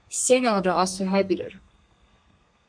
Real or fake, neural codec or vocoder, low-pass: fake; codec, 32 kHz, 1.9 kbps, SNAC; 9.9 kHz